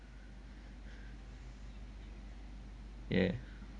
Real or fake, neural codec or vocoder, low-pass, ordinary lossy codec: real; none; 9.9 kHz; MP3, 64 kbps